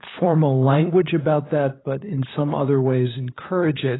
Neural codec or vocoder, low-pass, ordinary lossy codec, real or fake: codec, 16 kHz, 8 kbps, FunCodec, trained on LibriTTS, 25 frames a second; 7.2 kHz; AAC, 16 kbps; fake